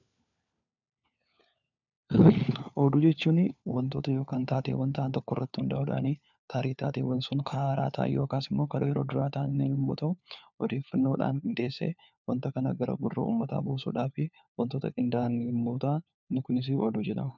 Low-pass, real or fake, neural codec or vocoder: 7.2 kHz; fake; codec, 16 kHz, 4 kbps, FunCodec, trained on LibriTTS, 50 frames a second